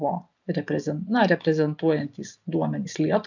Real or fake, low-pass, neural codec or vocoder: real; 7.2 kHz; none